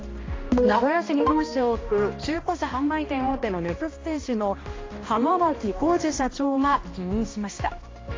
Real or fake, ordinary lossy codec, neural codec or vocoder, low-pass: fake; AAC, 32 kbps; codec, 16 kHz, 1 kbps, X-Codec, HuBERT features, trained on balanced general audio; 7.2 kHz